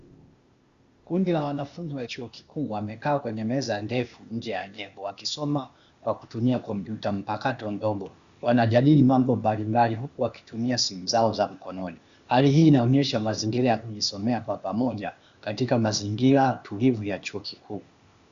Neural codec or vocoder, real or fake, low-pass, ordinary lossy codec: codec, 16 kHz, 0.8 kbps, ZipCodec; fake; 7.2 kHz; Opus, 64 kbps